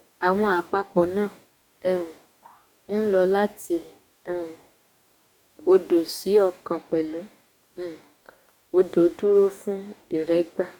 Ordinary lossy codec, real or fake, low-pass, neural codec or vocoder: none; fake; 19.8 kHz; codec, 44.1 kHz, 2.6 kbps, DAC